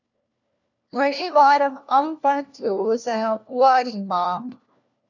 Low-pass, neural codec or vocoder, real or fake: 7.2 kHz; codec, 16 kHz, 1 kbps, FunCodec, trained on LibriTTS, 50 frames a second; fake